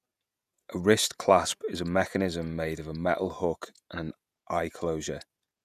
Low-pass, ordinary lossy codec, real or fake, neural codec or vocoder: 14.4 kHz; none; fake; vocoder, 48 kHz, 128 mel bands, Vocos